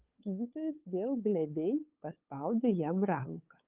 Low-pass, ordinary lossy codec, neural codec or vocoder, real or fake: 3.6 kHz; Opus, 32 kbps; codec, 16 kHz, 8 kbps, FunCodec, trained on LibriTTS, 25 frames a second; fake